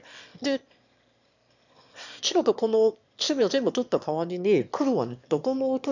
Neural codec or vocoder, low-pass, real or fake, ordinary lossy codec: autoencoder, 22.05 kHz, a latent of 192 numbers a frame, VITS, trained on one speaker; 7.2 kHz; fake; none